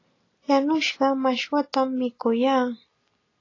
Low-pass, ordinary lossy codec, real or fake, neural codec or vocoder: 7.2 kHz; AAC, 32 kbps; real; none